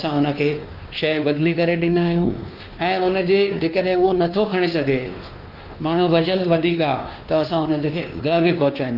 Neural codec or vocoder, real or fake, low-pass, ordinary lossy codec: codec, 16 kHz, 2 kbps, X-Codec, WavLM features, trained on Multilingual LibriSpeech; fake; 5.4 kHz; Opus, 32 kbps